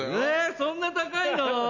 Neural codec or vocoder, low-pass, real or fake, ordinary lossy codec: none; 7.2 kHz; real; none